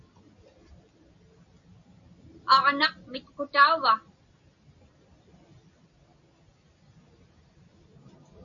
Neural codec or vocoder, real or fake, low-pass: none; real; 7.2 kHz